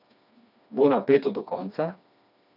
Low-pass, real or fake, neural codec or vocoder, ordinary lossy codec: 5.4 kHz; fake; codec, 16 kHz, 2 kbps, FreqCodec, smaller model; none